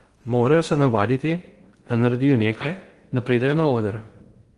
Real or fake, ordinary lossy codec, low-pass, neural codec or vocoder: fake; Opus, 24 kbps; 10.8 kHz; codec, 16 kHz in and 24 kHz out, 0.8 kbps, FocalCodec, streaming, 65536 codes